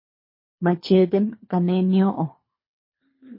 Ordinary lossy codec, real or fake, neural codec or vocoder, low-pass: MP3, 24 kbps; fake; codec, 24 kHz, 3 kbps, HILCodec; 5.4 kHz